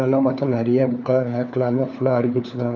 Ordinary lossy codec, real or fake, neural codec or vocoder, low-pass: none; fake; codec, 16 kHz, 4 kbps, FunCodec, trained on LibriTTS, 50 frames a second; 7.2 kHz